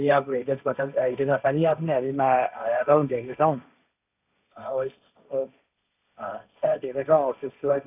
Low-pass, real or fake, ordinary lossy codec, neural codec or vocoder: 3.6 kHz; fake; none; codec, 16 kHz, 1.1 kbps, Voila-Tokenizer